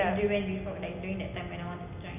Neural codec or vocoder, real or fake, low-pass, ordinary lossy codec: none; real; 3.6 kHz; none